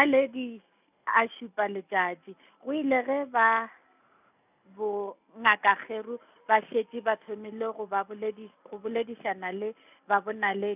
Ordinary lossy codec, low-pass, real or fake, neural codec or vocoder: none; 3.6 kHz; real; none